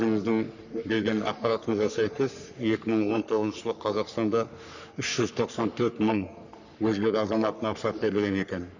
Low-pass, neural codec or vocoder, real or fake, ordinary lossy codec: 7.2 kHz; codec, 44.1 kHz, 3.4 kbps, Pupu-Codec; fake; none